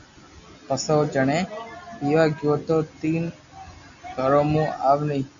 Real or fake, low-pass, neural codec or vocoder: real; 7.2 kHz; none